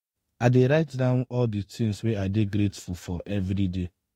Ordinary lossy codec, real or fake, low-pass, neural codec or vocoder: AAC, 48 kbps; fake; 19.8 kHz; autoencoder, 48 kHz, 32 numbers a frame, DAC-VAE, trained on Japanese speech